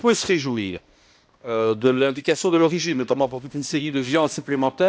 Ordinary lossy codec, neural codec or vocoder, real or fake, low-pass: none; codec, 16 kHz, 1 kbps, X-Codec, HuBERT features, trained on balanced general audio; fake; none